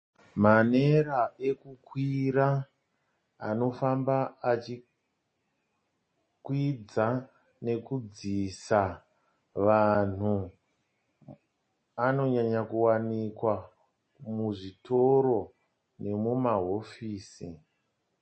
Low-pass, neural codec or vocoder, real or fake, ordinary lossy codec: 9.9 kHz; none; real; MP3, 32 kbps